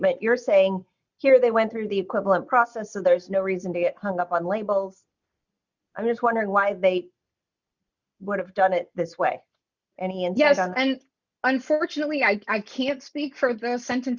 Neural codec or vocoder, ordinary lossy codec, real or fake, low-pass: none; Opus, 64 kbps; real; 7.2 kHz